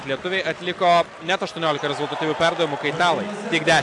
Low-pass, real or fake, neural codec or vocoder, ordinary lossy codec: 10.8 kHz; real; none; AAC, 64 kbps